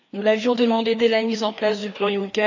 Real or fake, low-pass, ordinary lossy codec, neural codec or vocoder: fake; 7.2 kHz; none; codec, 16 kHz, 2 kbps, FreqCodec, larger model